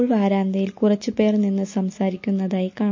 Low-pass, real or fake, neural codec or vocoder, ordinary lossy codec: 7.2 kHz; real; none; MP3, 32 kbps